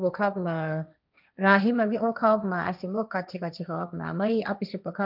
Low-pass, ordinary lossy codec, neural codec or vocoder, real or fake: 5.4 kHz; none; codec, 16 kHz, 1.1 kbps, Voila-Tokenizer; fake